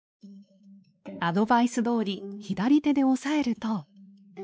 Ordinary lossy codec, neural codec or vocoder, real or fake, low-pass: none; codec, 16 kHz, 2 kbps, X-Codec, WavLM features, trained on Multilingual LibriSpeech; fake; none